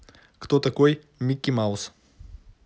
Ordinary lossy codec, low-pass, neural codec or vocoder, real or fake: none; none; none; real